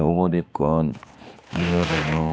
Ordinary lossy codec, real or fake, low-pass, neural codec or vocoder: none; fake; none; codec, 16 kHz, 2 kbps, X-Codec, HuBERT features, trained on balanced general audio